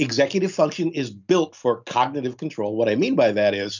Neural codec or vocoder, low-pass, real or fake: none; 7.2 kHz; real